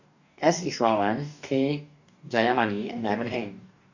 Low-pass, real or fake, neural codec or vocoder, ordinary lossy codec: 7.2 kHz; fake; codec, 44.1 kHz, 2.6 kbps, DAC; none